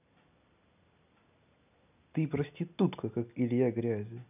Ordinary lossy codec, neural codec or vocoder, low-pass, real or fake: none; none; 3.6 kHz; real